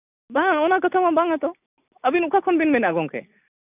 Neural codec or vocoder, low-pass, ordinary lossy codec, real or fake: none; 3.6 kHz; none; real